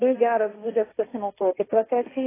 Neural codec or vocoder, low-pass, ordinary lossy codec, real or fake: codec, 16 kHz, 1.1 kbps, Voila-Tokenizer; 3.6 kHz; AAC, 16 kbps; fake